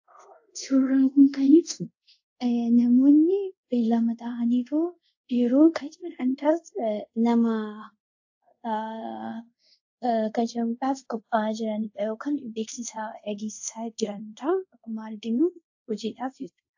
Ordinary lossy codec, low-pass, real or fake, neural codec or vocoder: AAC, 48 kbps; 7.2 kHz; fake; codec, 24 kHz, 0.5 kbps, DualCodec